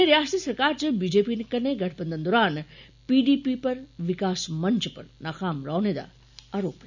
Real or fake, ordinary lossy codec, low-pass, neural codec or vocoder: real; none; 7.2 kHz; none